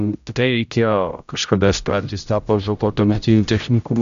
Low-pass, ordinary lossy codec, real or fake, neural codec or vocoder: 7.2 kHz; AAC, 96 kbps; fake; codec, 16 kHz, 0.5 kbps, X-Codec, HuBERT features, trained on general audio